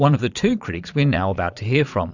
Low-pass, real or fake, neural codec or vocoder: 7.2 kHz; fake; vocoder, 22.05 kHz, 80 mel bands, Vocos